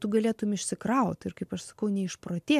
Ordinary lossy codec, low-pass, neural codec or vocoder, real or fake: MP3, 96 kbps; 14.4 kHz; none; real